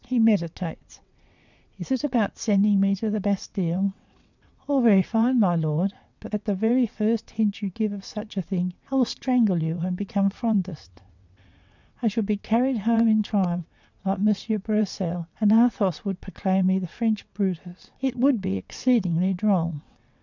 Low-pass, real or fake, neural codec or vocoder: 7.2 kHz; fake; vocoder, 22.05 kHz, 80 mel bands, WaveNeXt